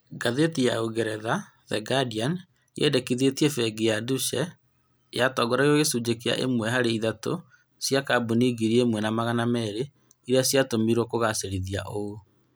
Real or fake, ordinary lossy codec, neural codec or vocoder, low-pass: real; none; none; none